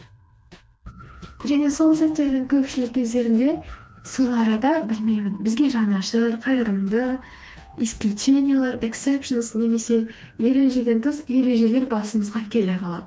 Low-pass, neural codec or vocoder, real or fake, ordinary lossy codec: none; codec, 16 kHz, 2 kbps, FreqCodec, smaller model; fake; none